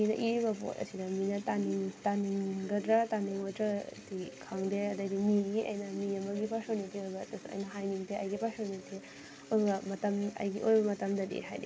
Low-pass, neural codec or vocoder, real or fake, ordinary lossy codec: none; none; real; none